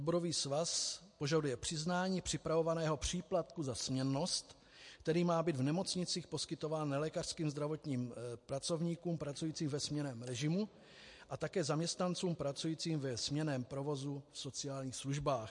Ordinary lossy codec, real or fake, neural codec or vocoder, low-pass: MP3, 48 kbps; real; none; 10.8 kHz